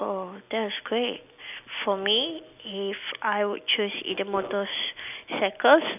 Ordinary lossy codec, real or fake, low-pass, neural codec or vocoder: none; real; 3.6 kHz; none